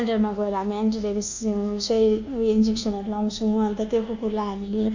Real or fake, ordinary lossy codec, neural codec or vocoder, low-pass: fake; Opus, 64 kbps; codec, 24 kHz, 1.2 kbps, DualCodec; 7.2 kHz